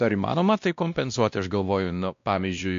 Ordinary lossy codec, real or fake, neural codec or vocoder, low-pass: MP3, 64 kbps; fake; codec, 16 kHz, 1 kbps, X-Codec, WavLM features, trained on Multilingual LibriSpeech; 7.2 kHz